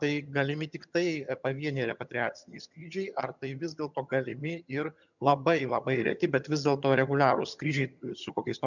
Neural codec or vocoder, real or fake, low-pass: vocoder, 22.05 kHz, 80 mel bands, HiFi-GAN; fake; 7.2 kHz